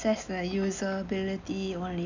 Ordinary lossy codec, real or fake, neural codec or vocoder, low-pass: none; real; none; 7.2 kHz